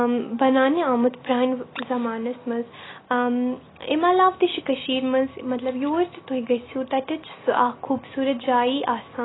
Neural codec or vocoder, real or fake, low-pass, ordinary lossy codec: none; real; 7.2 kHz; AAC, 16 kbps